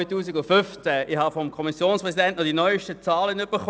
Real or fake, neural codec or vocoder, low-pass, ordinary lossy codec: real; none; none; none